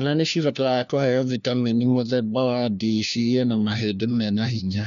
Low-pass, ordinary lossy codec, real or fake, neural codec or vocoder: 7.2 kHz; none; fake; codec, 16 kHz, 1 kbps, FunCodec, trained on LibriTTS, 50 frames a second